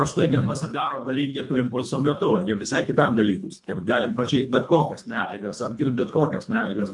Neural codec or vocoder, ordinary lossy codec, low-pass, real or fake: codec, 24 kHz, 1.5 kbps, HILCodec; MP3, 64 kbps; 10.8 kHz; fake